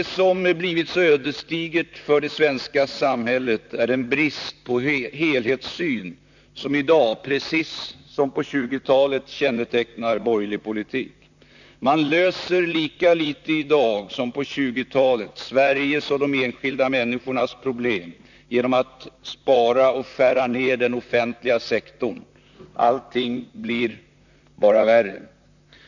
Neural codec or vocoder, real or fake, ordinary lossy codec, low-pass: vocoder, 44.1 kHz, 128 mel bands, Pupu-Vocoder; fake; none; 7.2 kHz